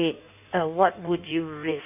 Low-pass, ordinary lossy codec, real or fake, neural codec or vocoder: 3.6 kHz; none; fake; codec, 16 kHz in and 24 kHz out, 1.1 kbps, FireRedTTS-2 codec